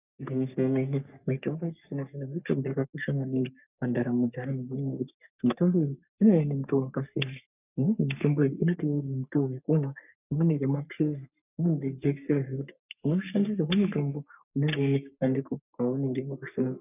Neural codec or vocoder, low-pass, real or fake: codec, 44.1 kHz, 3.4 kbps, Pupu-Codec; 3.6 kHz; fake